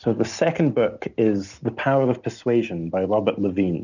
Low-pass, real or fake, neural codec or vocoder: 7.2 kHz; real; none